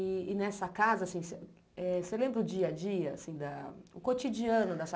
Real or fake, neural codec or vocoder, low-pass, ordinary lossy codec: real; none; none; none